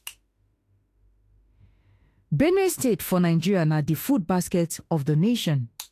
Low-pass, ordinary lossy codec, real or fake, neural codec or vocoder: 14.4 kHz; AAC, 64 kbps; fake; autoencoder, 48 kHz, 32 numbers a frame, DAC-VAE, trained on Japanese speech